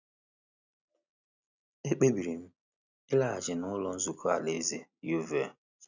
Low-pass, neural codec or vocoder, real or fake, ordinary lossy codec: 7.2 kHz; none; real; none